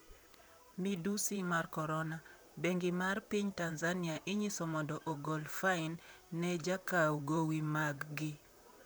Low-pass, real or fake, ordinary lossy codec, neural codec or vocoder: none; fake; none; vocoder, 44.1 kHz, 128 mel bands, Pupu-Vocoder